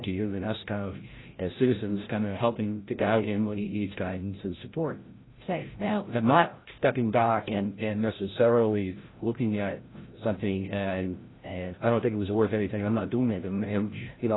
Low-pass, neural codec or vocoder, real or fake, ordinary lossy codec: 7.2 kHz; codec, 16 kHz, 0.5 kbps, FreqCodec, larger model; fake; AAC, 16 kbps